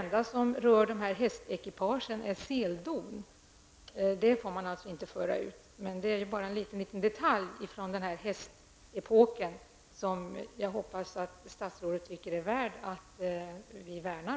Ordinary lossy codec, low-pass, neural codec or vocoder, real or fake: none; none; none; real